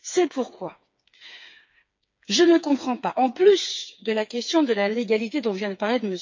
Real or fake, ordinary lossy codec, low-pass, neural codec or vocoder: fake; MP3, 48 kbps; 7.2 kHz; codec, 16 kHz, 4 kbps, FreqCodec, smaller model